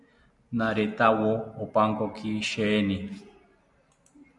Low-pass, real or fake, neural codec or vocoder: 10.8 kHz; real; none